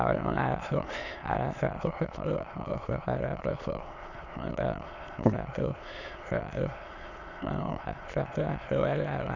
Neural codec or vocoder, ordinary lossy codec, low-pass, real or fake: autoencoder, 22.05 kHz, a latent of 192 numbers a frame, VITS, trained on many speakers; Opus, 64 kbps; 7.2 kHz; fake